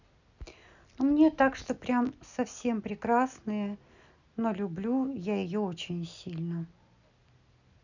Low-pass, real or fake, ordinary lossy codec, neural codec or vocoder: 7.2 kHz; real; none; none